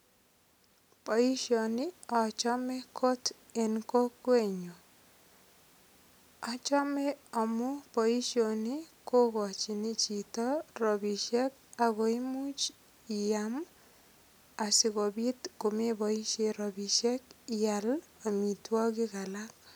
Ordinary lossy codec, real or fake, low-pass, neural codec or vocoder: none; real; none; none